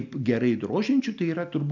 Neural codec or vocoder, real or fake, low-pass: none; real; 7.2 kHz